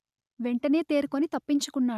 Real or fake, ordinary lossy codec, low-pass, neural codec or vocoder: real; none; 14.4 kHz; none